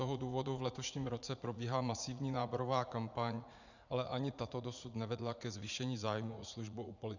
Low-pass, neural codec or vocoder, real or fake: 7.2 kHz; vocoder, 44.1 kHz, 80 mel bands, Vocos; fake